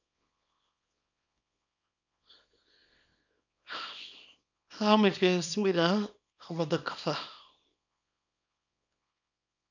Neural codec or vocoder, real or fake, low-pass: codec, 24 kHz, 0.9 kbps, WavTokenizer, small release; fake; 7.2 kHz